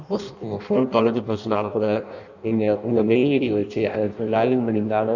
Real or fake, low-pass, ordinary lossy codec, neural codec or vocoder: fake; 7.2 kHz; none; codec, 16 kHz in and 24 kHz out, 0.6 kbps, FireRedTTS-2 codec